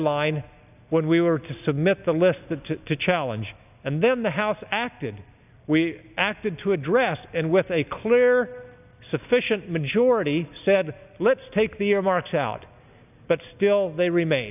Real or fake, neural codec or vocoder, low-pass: real; none; 3.6 kHz